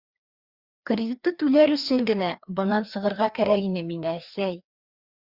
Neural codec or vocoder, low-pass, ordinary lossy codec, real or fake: codec, 16 kHz, 2 kbps, FreqCodec, larger model; 5.4 kHz; Opus, 64 kbps; fake